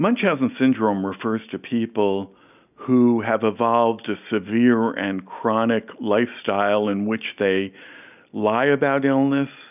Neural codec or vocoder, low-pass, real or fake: none; 3.6 kHz; real